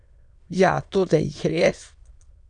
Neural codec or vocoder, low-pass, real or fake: autoencoder, 22.05 kHz, a latent of 192 numbers a frame, VITS, trained on many speakers; 9.9 kHz; fake